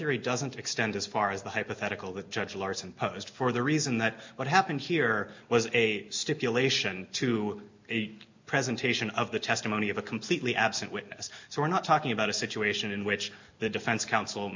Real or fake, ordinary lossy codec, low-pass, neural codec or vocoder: real; MP3, 48 kbps; 7.2 kHz; none